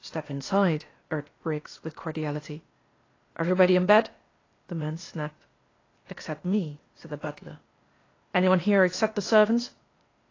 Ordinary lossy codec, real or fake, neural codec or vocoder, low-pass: AAC, 32 kbps; fake; codec, 16 kHz, 0.8 kbps, ZipCodec; 7.2 kHz